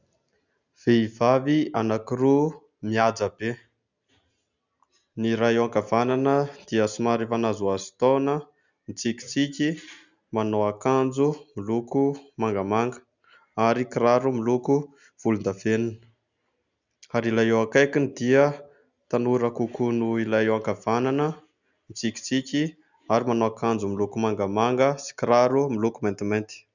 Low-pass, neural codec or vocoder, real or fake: 7.2 kHz; none; real